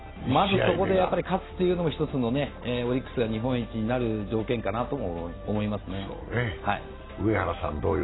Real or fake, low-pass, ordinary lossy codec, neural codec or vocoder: real; 7.2 kHz; AAC, 16 kbps; none